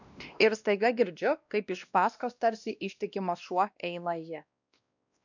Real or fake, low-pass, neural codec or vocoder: fake; 7.2 kHz; codec, 16 kHz, 1 kbps, X-Codec, WavLM features, trained on Multilingual LibriSpeech